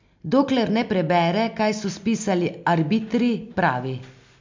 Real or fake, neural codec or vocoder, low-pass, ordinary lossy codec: real; none; 7.2 kHz; MP3, 64 kbps